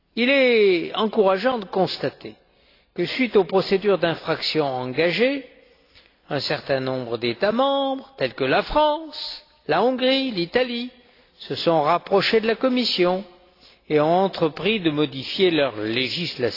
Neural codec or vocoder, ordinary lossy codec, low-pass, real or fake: none; AAC, 32 kbps; 5.4 kHz; real